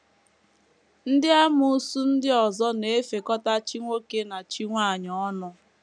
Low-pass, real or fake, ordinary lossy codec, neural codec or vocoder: 9.9 kHz; real; none; none